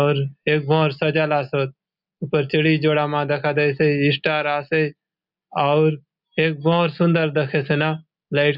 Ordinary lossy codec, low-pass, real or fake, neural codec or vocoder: none; 5.4 kHz; real; none